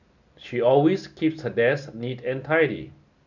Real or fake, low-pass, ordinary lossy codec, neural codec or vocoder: real; 7.2 kHz; none; none